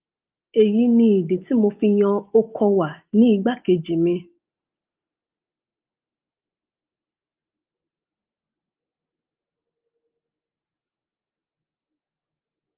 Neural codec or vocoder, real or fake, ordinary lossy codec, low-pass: none; real; Opus, 24 kbps; 3.6 kHz